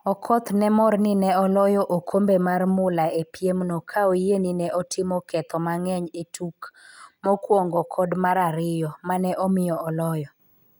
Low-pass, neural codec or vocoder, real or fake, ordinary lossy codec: none; none; real; none